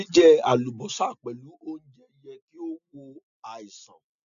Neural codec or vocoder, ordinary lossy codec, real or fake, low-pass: none; none; real; 7.2 kHz